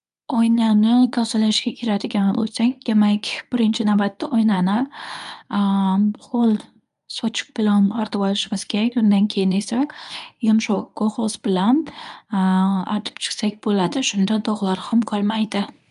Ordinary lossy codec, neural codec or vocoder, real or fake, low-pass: none; codec, 24 kHz, 0.9 kbps, WavTokenizer, medium speech release version 1; fake; 10.8 kHz